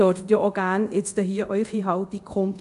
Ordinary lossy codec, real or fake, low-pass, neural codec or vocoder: none; fake; 10.8 kHz; codec, 24 kHz, 0.5 kbps, DualCodec